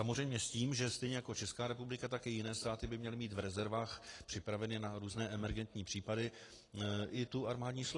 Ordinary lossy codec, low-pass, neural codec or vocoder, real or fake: AAC, 32 kbps; 10.8 kHz; none; real